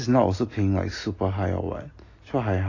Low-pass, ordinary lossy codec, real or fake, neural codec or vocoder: 7.2 kHz; AAC, 32 kbps; real; none